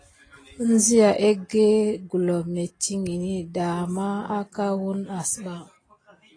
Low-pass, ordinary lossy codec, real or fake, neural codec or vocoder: 9.9 kHz; AAC, 32 kbps; real; none